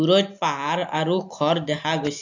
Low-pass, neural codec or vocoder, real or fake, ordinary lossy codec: 7.2 kHz; none; real; AAC, 48 kbps